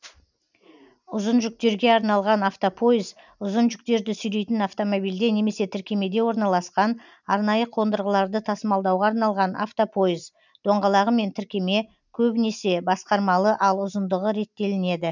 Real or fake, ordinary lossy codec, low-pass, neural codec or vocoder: real; none; 7.2 kHz; none